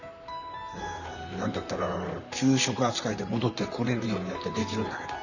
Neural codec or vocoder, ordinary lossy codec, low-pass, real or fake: vocoder, 44.1 kHz, 128 mel bands, Pupu-Vocoder; none; 7.2 kHz; fake